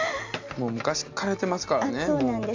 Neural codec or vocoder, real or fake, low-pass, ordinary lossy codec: none; real; 7.2 kHz; AAC, 48 kbps